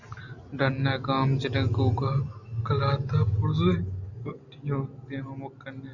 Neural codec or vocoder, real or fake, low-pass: none; real; 7.2 kHz